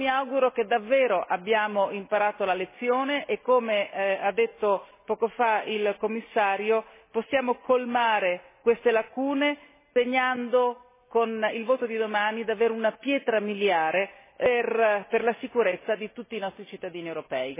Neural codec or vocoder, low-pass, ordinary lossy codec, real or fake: none; 3.6 kHz; MP3, 16 kbps; real